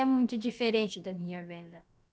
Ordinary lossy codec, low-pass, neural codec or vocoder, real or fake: none; none; codec, 16 kHz, about 1 kbps, DyCAST, with the encoder's durations; fake